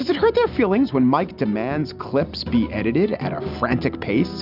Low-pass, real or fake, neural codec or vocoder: 5.4 kHz; real; none